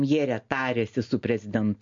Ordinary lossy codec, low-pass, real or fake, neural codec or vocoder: MP3, 48 kbps; 7.2 kHz; real; none